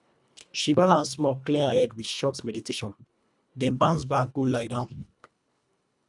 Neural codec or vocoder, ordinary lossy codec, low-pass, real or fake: codec, 24 kHz, 1.5 kbps, HILCodec; none; 10.8 kHz; fake